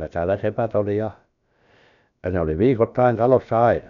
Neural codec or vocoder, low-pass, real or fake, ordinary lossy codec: codec, 16 kHz, about 1 kbps, DyCAST, with the encoder's durations; 7.2 kHz; fake; none